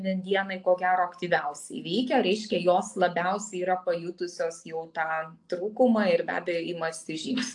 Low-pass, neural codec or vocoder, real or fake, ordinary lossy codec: 10.8 kHz; none; real; AAC, 64 kbps